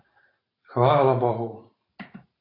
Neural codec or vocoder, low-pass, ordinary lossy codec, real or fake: vocoder, 44.1 kHz, 128 mel bands every 512 samples, BigVGAN v2; 5.4 kHz; AAC, 24 kbps; fake